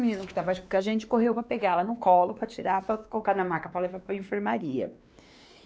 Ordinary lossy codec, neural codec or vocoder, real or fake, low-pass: none; codec, 16 kHz, 2 kbps, X-Codec, WavLM features, trained on Multilingual LibriSpeech; fake; none